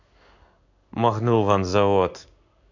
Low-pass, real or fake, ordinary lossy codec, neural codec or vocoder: 7.2 kHz; fake; none; codec, 16 kHz in and 24 kHz out, 1 kbps, XY-Tokenizer